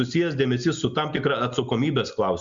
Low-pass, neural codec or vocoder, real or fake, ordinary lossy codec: 7.2 kHz; none; real; Opus, 64 kbps